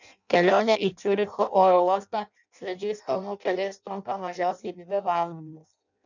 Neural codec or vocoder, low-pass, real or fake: codec, 16 kHz in and 24 kHz out, 0.6 kbps, FireRedTTS-2 codec; 7.2 kHz; fake